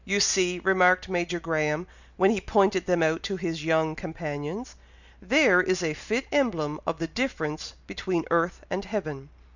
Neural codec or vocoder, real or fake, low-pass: none; real; 7.2 kHz